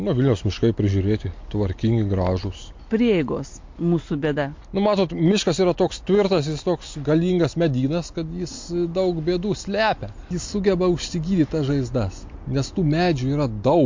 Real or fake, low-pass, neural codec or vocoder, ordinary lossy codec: real; 7.2 kHz; none; MP3, 48 kbps